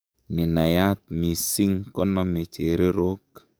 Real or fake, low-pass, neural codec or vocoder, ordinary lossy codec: fake; none; codec, 44.1 kHz, 7.8 kbps, DAC; none